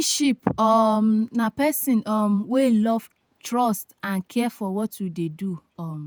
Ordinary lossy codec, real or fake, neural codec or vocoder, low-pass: none; fake; vocoder, 48 kHz, 128 mel bands, Vocos; none